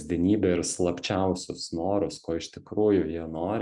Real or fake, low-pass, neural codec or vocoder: real; 10.8 kHz; none